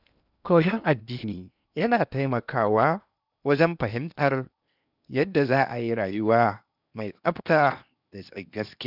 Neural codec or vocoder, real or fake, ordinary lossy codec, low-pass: codec, 16 kHz in and 24 kHz out, 0.8 kbps, FocalCodec, streaming, 65536 codes; fake; none; 5.4 kHz